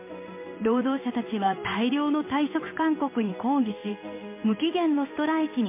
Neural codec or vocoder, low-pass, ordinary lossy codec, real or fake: codec, 16 kHz in and 24 kHz out, 1 kbps, XY-Tokenizer; 3.6 kHz; MP3, 24 kbps; fake